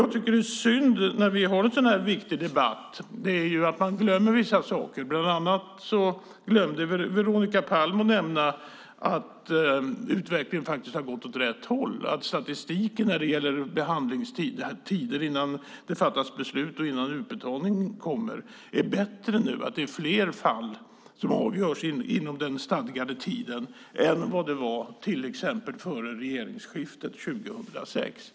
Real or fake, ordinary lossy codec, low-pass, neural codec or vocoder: real; none; none; none